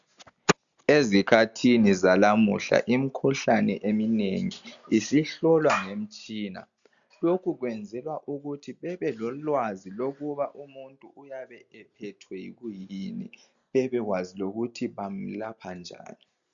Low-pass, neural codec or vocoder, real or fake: 7.2 kHz; none; real